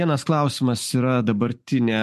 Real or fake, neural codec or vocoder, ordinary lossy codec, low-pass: real; none; MP3, 64 kbps; 14.4 kHz